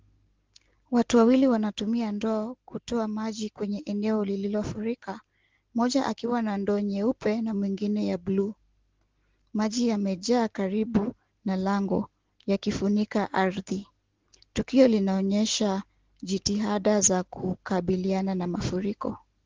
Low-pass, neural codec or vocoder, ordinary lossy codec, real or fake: 7.2 kHz; none; Opus, 16 kbps; real